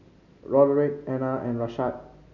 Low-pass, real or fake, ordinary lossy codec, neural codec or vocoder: 7.2 kHz; real; none; none